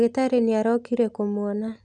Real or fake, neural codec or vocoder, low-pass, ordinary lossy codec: real; none; 10.8 kHz; none